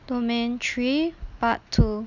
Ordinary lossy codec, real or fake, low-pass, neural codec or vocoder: none; real; 7.2 kHz; none